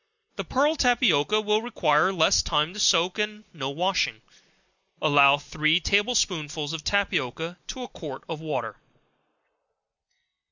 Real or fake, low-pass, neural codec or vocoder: real; 7.2 kHz; none